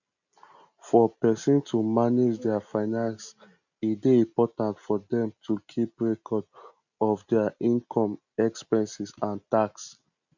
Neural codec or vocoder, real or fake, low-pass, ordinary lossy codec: none; real; 7.2 kHz; none